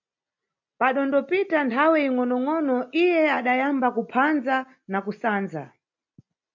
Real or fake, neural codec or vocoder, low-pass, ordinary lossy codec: real; none; 7.2 kHz; MP3, 48 kbps